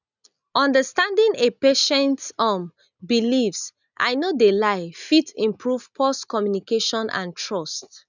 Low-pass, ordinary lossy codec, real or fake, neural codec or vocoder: 7.2 kHz; none; real; none